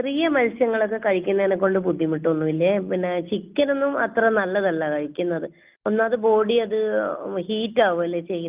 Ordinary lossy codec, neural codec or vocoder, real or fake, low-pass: Opus, 32 kbps; none; real; 3.6 kHz